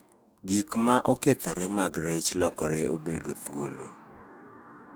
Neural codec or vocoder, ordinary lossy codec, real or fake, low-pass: codec, 44.1 kHz, 2.6 kbps, DAC; none; fake; none